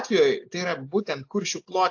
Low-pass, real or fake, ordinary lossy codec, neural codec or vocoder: 7.2 kHz; real; AAC, 48 kbps; none